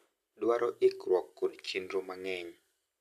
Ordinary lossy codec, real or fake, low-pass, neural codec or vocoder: none; real; 14.4 kHz; none